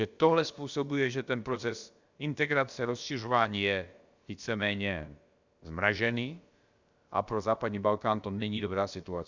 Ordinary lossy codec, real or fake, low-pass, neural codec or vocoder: Opus, 64 kbps; fake; 7.2 kHz; codec, 16 kHz, about 1 kbps, DyCAST, with the encoder's durations